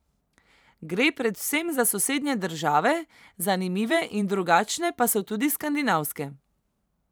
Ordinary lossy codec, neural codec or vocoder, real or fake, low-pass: none; vocoder, 44.1 kHz, 128 mel bands every 512 samples, BigVGAN v2; fake; none